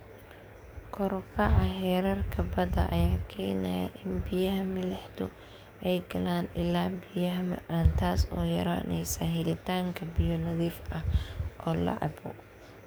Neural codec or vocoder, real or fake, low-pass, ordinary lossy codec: codec, 44.1 kHz, 7.8 kbps, DAC; fake; none; none